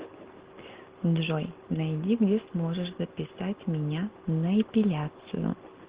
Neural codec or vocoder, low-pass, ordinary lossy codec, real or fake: none; 3.6 kHz; Opus, 16 kbps; real